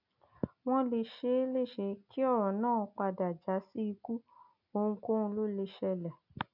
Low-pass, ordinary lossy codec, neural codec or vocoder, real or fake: 5.4 kHz; none; none; real